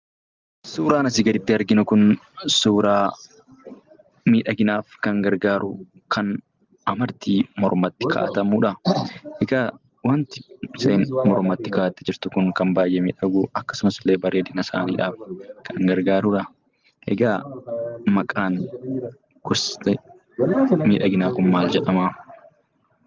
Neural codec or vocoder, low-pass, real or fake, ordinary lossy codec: none; 7.2 kHz; real; Opus, 24 kbps